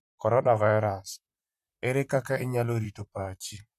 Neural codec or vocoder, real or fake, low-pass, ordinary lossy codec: vocoder, 44.1 kHz, 128 mel bands, Pupu-Vocoder; fake; 14.4 kHz; none